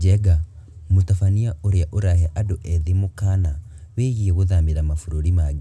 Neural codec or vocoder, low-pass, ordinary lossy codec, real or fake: none; none; none; real